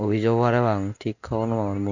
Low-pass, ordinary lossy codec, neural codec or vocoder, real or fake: 7.2 kHz; none; none; real